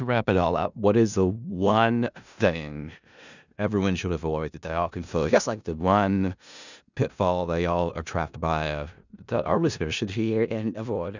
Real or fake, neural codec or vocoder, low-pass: fake; codec, 16 kHz in and 24 kHz out, 0.4 kbps, LongCat-Audio-Codec, four codebook decoder; 7.2 kHz